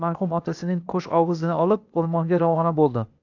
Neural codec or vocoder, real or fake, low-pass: codec, 16 kHz, 0.8 kbps, ZipCodec; fake; 7.2 kHz